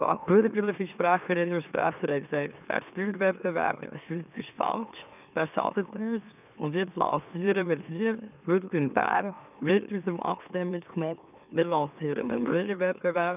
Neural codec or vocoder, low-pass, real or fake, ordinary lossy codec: autoencoder, 44.1 kHz, a latent of 192 numbers a frame, MeloTTS; 3.6 kHz; fake; none